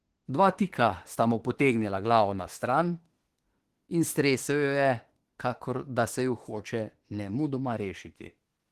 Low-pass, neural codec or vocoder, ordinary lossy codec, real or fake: 14.4 kHz; autoencoder, 48 kHz, 32 numbers a frame, DAC-VAE, trained on Japanese speech; Opus, 16 kbps; fake